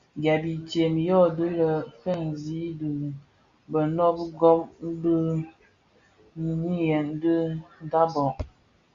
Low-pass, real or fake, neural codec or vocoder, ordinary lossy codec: 7.2 kHz; real; none; Opus, 64 kbps